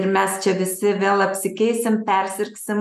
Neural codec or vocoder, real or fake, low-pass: none; real; 14.4 kHz